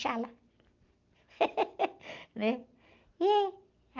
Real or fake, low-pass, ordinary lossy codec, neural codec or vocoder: real; 7.2 kHz; Opus, 24 kbps; none